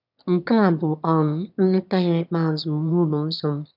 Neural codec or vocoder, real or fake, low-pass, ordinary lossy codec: autoencoder, 22.05 kHz, a latent of 192 numbers a frame, VITS, trained on one speaker; fake; 5.4 kHz; none